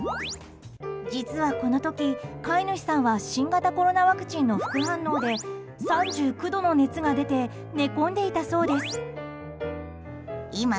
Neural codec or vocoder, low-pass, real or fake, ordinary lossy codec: none; none; real; none